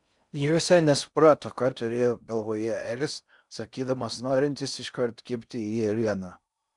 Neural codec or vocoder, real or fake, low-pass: codec, 16 kHz in and 24 kHz out, 0.6 kbps, FocalCodec, streaming, 4096 codes; fake; 10.8 kHz